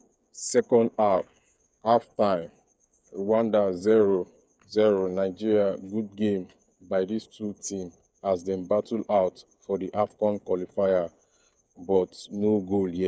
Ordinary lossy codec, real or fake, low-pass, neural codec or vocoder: none; fake; none; codec, 16 kHz, 8 kbps, FreqCodec, smaller model